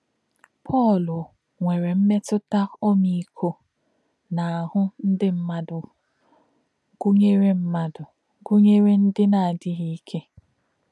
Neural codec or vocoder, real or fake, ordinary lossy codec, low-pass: none; real; none; none